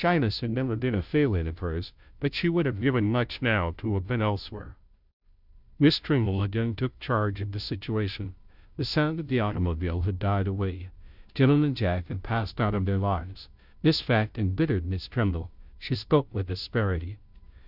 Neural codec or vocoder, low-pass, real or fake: codec, 16 kHz, 0.5 kbps, FunCodec, trained on Chinese and English, 25 frames a second; 5.4 kHz; fake